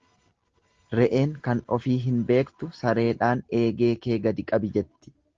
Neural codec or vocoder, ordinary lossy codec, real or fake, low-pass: none; Opus, 16 kbps; real; 7.2 kHz